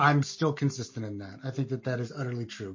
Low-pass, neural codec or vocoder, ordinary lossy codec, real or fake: 7.2 kHz; none; MP3, 32 kbps; real